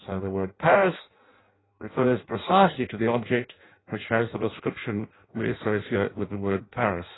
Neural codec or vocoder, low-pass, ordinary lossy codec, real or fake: codec, 16 kHz in and 24 kHz out, 0.6 kbps, FireRedTTS-2 codec; 7.2 kHz; AAC, 16 kbps; fake